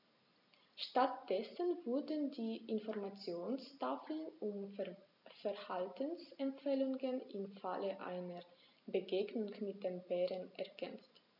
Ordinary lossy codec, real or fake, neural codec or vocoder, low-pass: none; real; none; 5.4 kHz